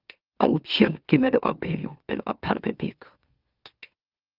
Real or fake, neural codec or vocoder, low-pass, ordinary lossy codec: fake; autoencoder, 44.1 kHz, a latent of 192 numbers a frame, MeloTTS; 5.4 kHz; Opus, 24 kbps